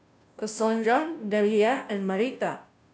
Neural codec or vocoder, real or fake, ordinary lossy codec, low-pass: codec, 16 kHz, 0.5 kbps, FunCodec, trained on Chinese and English, 25 frames a second; fake; none; none